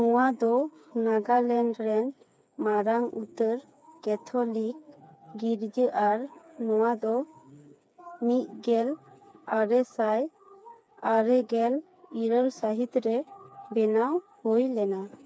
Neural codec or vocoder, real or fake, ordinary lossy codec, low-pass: codec, 16 kHz, 4 kbps, FreqCodec, smaller model; fake; none; none